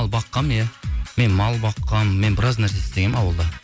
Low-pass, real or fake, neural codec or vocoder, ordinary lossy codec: none; real; none; none